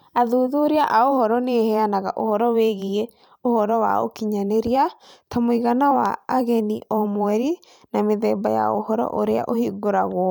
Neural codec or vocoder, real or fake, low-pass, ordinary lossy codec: vocoder, 44.1 kHz, 128 mel bands every 512 samples, BigVGAN v2; fake; none; none